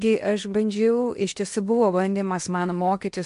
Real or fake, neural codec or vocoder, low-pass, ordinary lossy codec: fake; codec, 16 kHz in and 24 kHz out, 0.8 kbps, FocalCodec, streaming, 65536 codes; 10.8 kHz; MP3, 64 kbps